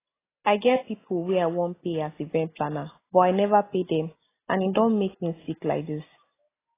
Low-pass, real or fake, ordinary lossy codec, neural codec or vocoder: 3.6 kHz; real; AAC, 16 kbps; none